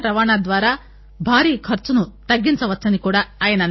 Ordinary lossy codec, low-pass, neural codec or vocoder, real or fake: MP3, 24 kbps; 7.2 kHz; none; real